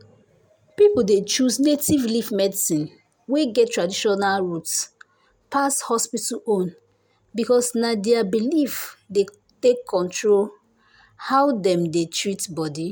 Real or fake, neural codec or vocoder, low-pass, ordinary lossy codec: real; none; none; none